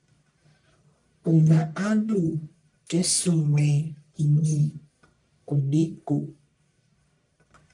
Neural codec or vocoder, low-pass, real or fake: codec, 44.1 kHz, 1.7 kbps, Pupu-Codec; 10.8 kHz; fake